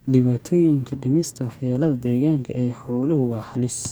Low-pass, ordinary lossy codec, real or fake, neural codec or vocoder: none; none; fake; codec, 44.1 kHz, 2.6 kbps, DAC